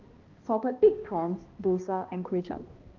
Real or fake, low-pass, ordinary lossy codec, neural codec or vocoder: fake; 7.2 kHz; Opus, 24 kbps; codec, 16 kHz, 1 kbps, X-Codec, HuBERT features, trained on balanced general audio